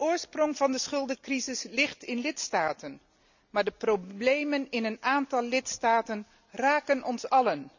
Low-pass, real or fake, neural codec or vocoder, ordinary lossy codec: 7.2 kHz; real; none; none